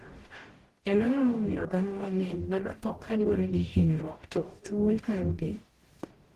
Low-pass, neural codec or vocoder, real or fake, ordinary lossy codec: 14.4 kHz; codec, 44.1 kHz, 0.9 kbps, DAC; fake; Opus, 16 kbps